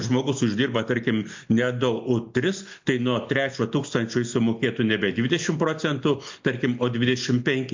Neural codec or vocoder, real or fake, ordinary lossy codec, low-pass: none; real; MP3, 48 kbps; 7.2 kHz